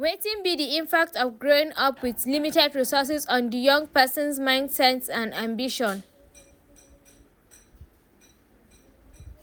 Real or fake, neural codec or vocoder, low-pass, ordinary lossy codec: real; none; none; none